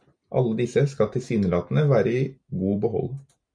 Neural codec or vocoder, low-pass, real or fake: none; 9.9 kHz; real